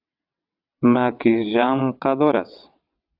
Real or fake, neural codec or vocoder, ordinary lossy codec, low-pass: fake; vocoder, 22.05 kHz, 80 mel bands, WaveNeXt; AAC, 48 kbps; 5.4 kHz